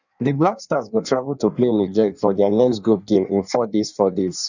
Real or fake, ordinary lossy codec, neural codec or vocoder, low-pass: fake; none; codec, 16 kHz in and 24 kHz out, 1.1 kbps, FireRedTTS-2 codec; 7.2 kHz